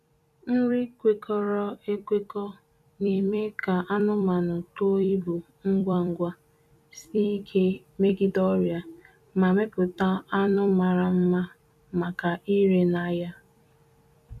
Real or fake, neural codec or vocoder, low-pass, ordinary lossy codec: fake; vocoder, 44.1 kHz, 128 mel bands every 256 samples, BigVGAN v2; 14.4 kHz; none